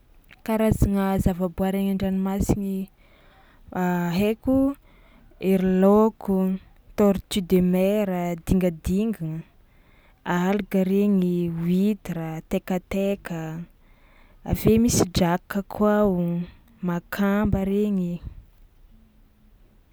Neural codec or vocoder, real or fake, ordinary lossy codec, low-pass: none; real; none; none